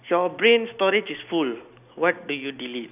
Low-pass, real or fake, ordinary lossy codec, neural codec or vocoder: 3.6 kHz; real; none; none